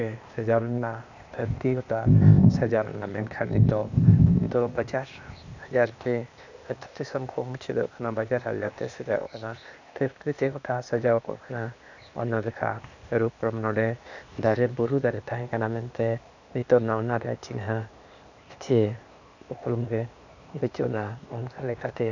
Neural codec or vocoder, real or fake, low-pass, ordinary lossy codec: codec, 16 kHz, 0.8 kbps, ZipCodec; fake; 7.2 kHz; none